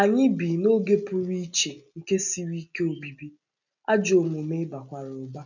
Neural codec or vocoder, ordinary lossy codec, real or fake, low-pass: none; none; real; 7.2 kHz